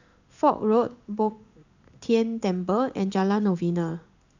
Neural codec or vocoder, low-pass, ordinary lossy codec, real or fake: codec, 16 kHz in and 24 kHz out, 1 kbps, XY-Tokenizer; 7.2 kHz; none; fake